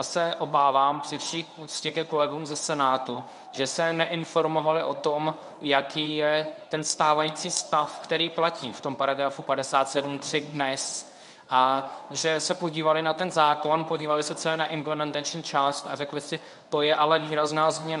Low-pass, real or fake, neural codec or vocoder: 10.8 kHz; fake; codec, 24 kHz, 0.9 kbps, WavTokenizer, medium speech release version 1